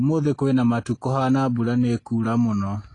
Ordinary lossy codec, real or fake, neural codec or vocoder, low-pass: AAC, 32 kbps; real; none; 10.8 kHz